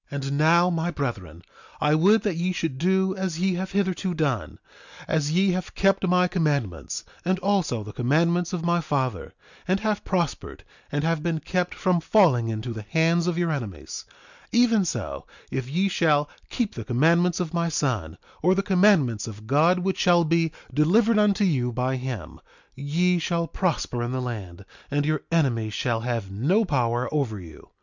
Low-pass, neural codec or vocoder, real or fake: 7.2 kHz; none; real